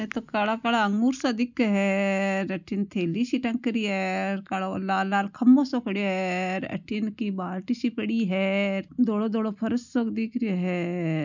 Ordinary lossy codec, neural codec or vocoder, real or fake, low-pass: none; none; real; 7.2 kHz